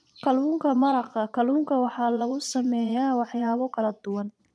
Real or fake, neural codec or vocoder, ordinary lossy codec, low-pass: fake; vocoder, 22.05 kHz, 80 mel bands, Vocos; none; none